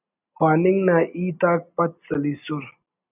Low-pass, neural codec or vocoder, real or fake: 3.6 kHz; none; real